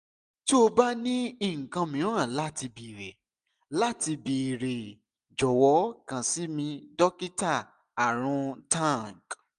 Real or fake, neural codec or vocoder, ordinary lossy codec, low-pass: real; none; AAC, 96 kbps; 10.8 kHz